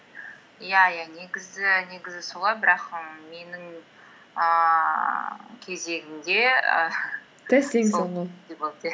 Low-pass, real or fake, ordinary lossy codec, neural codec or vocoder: none; real; none; none